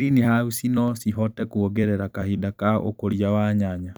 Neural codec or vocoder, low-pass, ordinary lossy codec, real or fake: vocoder, 44.1 kHz, 128 mel bands every 256 samples, BigVGAN v2; none; none; fake